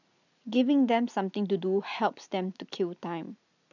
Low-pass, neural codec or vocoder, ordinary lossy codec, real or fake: 7.2 kHz; none; none; real